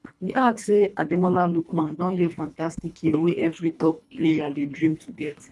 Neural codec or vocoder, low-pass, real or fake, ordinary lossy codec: codec, 24 kHz, 1.5 kbps, HILCodec; none; fake; none